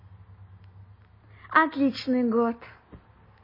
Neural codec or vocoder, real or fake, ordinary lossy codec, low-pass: none; real; MP3, 24 kbps; 5.4 kHz